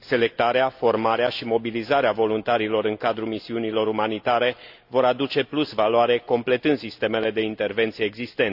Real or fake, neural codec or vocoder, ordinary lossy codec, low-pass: real; none; AAC, 48 kbps; 5.4 kHz